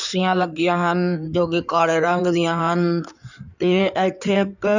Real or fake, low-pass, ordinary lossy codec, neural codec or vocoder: fake; 7.2 kHz; none; codec, 16 kHz in and 24 kHz out, 2.2 kbps, FireRedTTS-2 codec